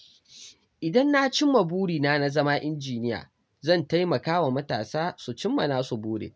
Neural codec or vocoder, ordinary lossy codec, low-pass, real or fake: none; none; none; real